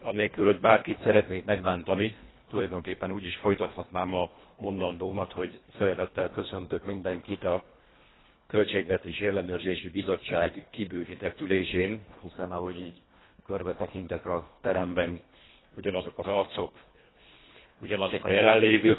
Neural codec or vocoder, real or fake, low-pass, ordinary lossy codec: codec, 24 kHz, 1.5 kbps, HILCodec; fake; 7.2 kHz; AAC, 16 kbps